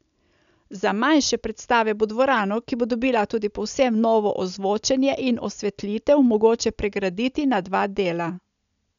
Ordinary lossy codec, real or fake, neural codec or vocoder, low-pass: none; real; none; 7.2 kHz